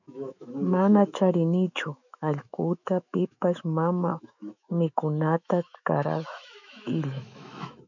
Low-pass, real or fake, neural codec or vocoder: 7.2 kHz; fake; autoencoder, 48 kHz, 128 numbers a frame, DAC-VAE, trained on Japanese speech